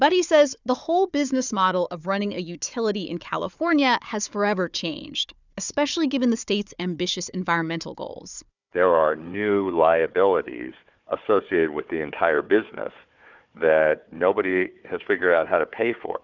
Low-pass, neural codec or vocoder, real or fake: 7.2 kHz; codec, 16 kHz, 4 kbps, FunCodec, trained on Chinese and English, 50 frames a second; fake